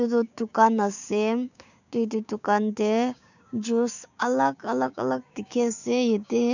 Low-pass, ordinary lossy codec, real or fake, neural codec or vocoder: 7.2 kHz; none; fake; codec, 16 kHz, 6 kbps, DAC